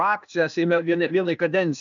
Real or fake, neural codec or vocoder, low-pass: fake; codec, 16 kHz, 0.8 kbps, ZipCodec; 7.2 kHz